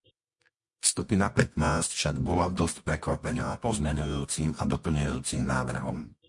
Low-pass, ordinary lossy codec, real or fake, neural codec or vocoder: 10.8 kHz; MP3, 48 kbps; fake; codec, 24 kHz, 0.9 kbps, WavTokenizer, medium music audio release